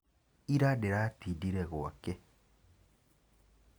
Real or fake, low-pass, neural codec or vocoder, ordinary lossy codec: real; none; none; none